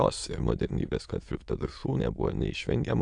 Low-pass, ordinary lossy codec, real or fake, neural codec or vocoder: 9.9 kHz; AAC, 64 kbps; fake; autoencoder, 22.05 kHz, a latent of 192 numbers a frame, VITS, trained on many speakers